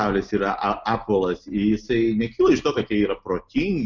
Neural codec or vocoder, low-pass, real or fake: none; 7.2 kHz; real